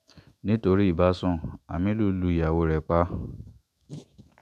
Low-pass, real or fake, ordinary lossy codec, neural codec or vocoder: 14.4 kHz; fake; none; autoencoder, 48 kHz, 128 numbers a frame, DAC-VAE, trained on Japanese speech